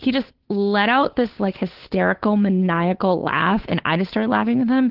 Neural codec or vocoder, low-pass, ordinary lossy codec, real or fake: codec, 16 kHz, 8 kbps, FunCodec, trained on Chinese and English, 25 frames a second; 5.4 kHz; Opus, 16 kbps; fake